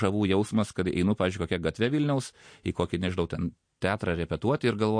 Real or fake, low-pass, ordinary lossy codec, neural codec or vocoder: real; 9.9 kHz; MP3, 48 kbps; none